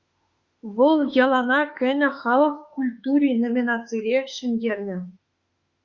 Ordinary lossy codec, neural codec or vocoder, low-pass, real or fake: Opus, 64 kbps; autoencoder, 48 kHz, 32 numbers a frame, DAC-VAE, trained on Japanese speech; 7.2 kHz; fake